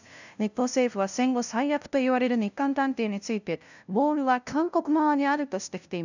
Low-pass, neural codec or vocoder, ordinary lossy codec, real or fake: 7.2 kHz; codec, 16 kHz, 0.5 kbps, FunCodec, trained on LibriTTS, 25 frames a second; none; fake